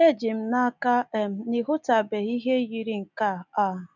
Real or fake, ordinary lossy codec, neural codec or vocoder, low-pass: real; none; none; 7.2 kHz